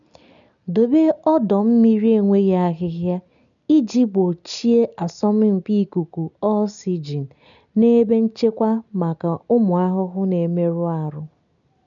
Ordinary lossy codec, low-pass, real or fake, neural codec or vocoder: none; 7.2 kHz; real; none